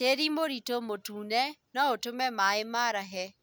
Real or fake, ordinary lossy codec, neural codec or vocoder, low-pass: real; none; none; none